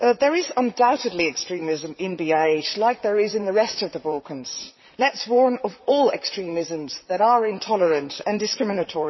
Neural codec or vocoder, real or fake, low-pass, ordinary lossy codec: vocoder, 44.1 kHz, 128 mel bands, Pupu-Vocoder; fake; 7.2 kHz; MP3, 24 kbps